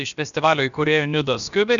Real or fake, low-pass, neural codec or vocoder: fake; 7.2 kHz; codec, 16 kHz, about 1 kbps, DyCAST, with the encoder's durations